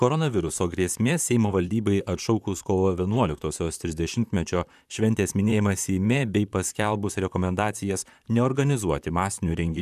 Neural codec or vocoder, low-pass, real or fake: vocoder, 44.1 kHz, 128 mel bands, Pupu-Vocoder; 14.4 kHz; fake